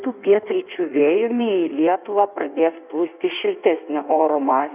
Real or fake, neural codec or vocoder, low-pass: fake; codec, 16 kHz in and 24 kHz out, 1.1 kbps, FireRedTTS-2 codec; 3.6 kHz